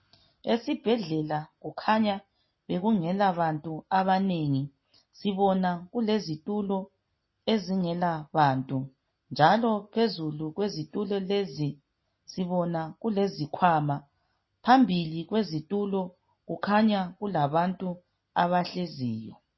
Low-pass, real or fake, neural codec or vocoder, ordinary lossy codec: 7.2 kHz; real; none; MP3, 24 kbps